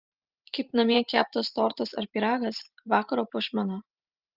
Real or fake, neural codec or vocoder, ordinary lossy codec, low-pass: fake; vocoder, 44.1 kHz, 128 mel bands every 512 samples, BigVGAN v2; Opus, 32 kbps; 5.4 kHz